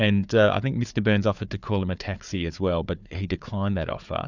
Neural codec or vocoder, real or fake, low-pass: codec, 16 kHz, 4 kbps, FunCodec, trained on Chinese and English, 50 frames a second; fake; 7.2 kHz